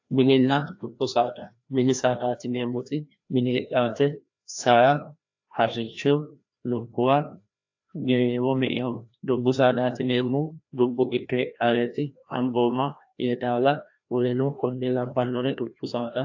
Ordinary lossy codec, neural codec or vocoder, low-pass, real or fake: AAC, 48 kbps; codec, 16 kHz, 1 kbps, FreqCodec, larger model; 7.2 kHz; fake